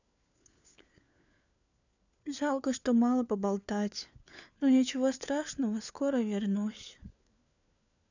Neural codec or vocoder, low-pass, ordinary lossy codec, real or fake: codec, 16 kHz, 4 kbps, FunCodec, trained on LibriTTS, 50 frames a second; 7.2 kHz; none; fake